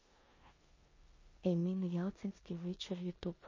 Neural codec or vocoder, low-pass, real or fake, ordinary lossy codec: codec, 16 kHz in and 24 kHz out, 0.9 kbps, LongCat-Audio-Codec, four codebook decoder; 7.2 kHz; fake; MP3, 32 kbps